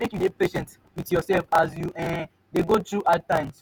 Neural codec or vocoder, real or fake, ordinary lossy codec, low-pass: vocoder, 48 kHz, 128 mel bands, Vocos; fake; none; none